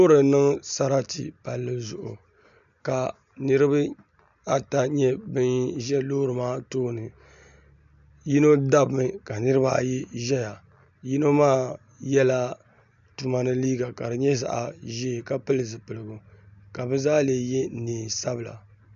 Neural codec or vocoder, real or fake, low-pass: none; real; 7.2 kHz